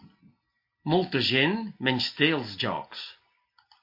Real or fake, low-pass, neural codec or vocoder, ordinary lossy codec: real; 5.4 kHz; none; MP3, 32 kbps